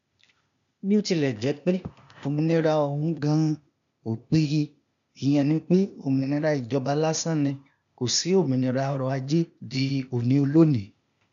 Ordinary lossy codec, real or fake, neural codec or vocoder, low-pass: none; fake; codec, 16 kHz, 0.8 kbps, ZipCodec; 7.2 kHz